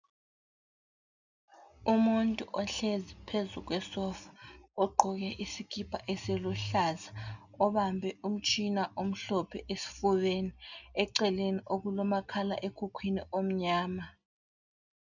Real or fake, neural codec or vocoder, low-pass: real; none; 7.2 kHz